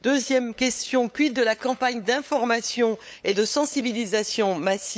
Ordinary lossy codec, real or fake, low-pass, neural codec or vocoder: none; fake; none; codec, 16 kHz, 8 kbps, FunCodec, trained on LibriTTS, 25 frames a second